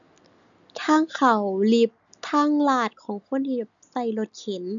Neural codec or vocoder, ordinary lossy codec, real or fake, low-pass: none; none; real; 7.2 kHz